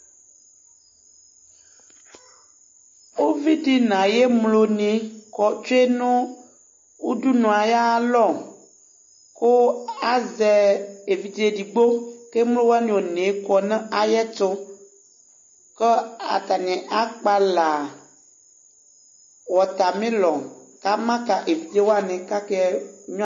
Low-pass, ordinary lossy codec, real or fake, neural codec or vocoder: 7.2 kHz; MP3, 32 kbps; real; none